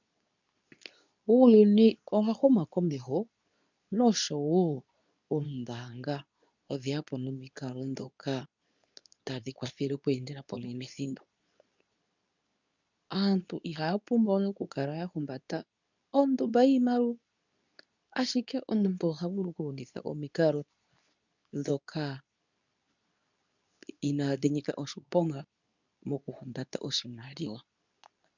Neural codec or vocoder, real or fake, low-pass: codec, 24 kHz, 0.9 kbps, WavTokenizer, medium speech release version 2; fake; 7.2 kHz